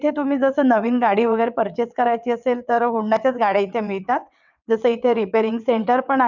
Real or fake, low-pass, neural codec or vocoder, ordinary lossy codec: fake; 7.2 kHz; vocoder, 22.05 kHz, 80 mel bands, WaveNeXt; none